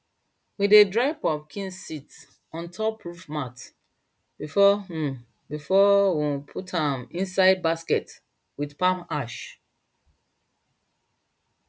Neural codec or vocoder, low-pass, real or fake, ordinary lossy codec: none; none; real; none